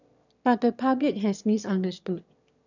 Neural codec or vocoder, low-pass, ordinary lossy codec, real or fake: autoencoder, 22.05 kHz, a latent of 192 numbers a frame, VITS, trained on one speaker; 7.2 kHz; none; fake